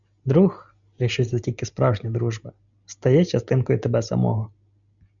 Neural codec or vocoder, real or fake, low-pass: none; real; 7.2 kHz